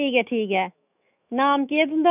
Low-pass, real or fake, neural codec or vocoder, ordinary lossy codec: 3.6 kHz; fake; autoencoder, 48 kHz, 128 numbers a frame, DAC-VAE, trained on Japanese speech; none